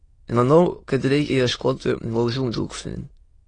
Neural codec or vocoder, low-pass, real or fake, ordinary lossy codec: autoencoder, 22.05 kHz, a latent of 192 numbers a frame, VITS, trained on many speakers; 9.9 kHz; fake; AAC, 32 kbps